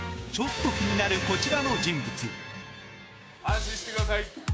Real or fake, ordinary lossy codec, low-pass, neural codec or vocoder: fake; none; none; codec, 16 kHz, 6 kbps, DAC